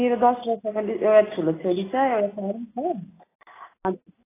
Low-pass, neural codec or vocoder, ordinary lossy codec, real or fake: 3.6 kHz; none; AAC, 16 kbps; real